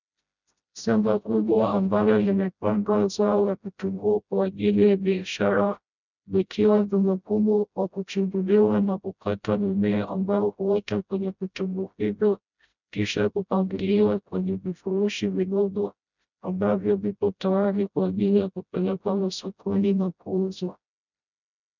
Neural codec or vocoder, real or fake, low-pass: codec, 16 kHz, 0.5 kbps, FreqCodec, smaller model; fake; 7.2 kHz